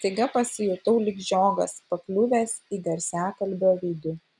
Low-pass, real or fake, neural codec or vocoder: 10.8 kHz; real; none